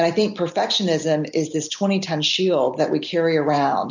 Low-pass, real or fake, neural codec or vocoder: 7.2 kHz; real; none